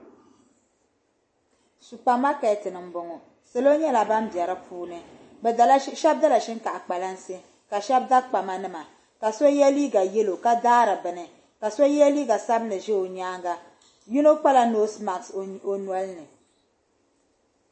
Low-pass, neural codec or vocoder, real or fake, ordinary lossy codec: 9.9 kHz; none; real; MP3, 32 kbps